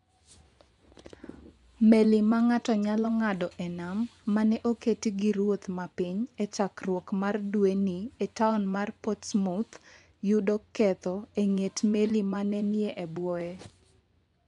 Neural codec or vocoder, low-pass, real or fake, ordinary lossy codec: vocoder, 24 kHz, 100 mel bands, Vocos; 10.8 kHz; fake; none